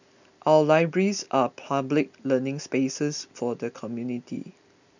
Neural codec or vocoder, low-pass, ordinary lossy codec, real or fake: none; 7.2 kHz; none; real